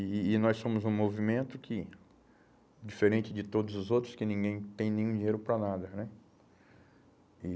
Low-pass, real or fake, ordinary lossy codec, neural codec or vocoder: none; real; none; none